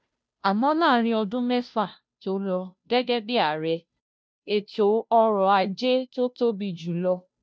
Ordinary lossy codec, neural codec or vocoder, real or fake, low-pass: none; codec, 16 kHz, 0.5 kbps, FunCodec, trained on Chinese and English, 25 frames a second; fake; none